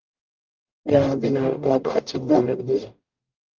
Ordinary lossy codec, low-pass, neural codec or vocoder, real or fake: Opus, 32 kbps; 7.2 kHz; codec, 44.1 kHz, 0.9 kbps, DAC; fake